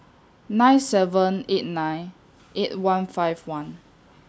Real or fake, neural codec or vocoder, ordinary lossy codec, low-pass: real; none; none; none